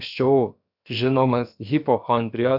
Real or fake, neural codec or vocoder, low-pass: fake; codec, 16 kHz, about 1 kbps, DyCAST, with the encoder's durations; 5.4 kHz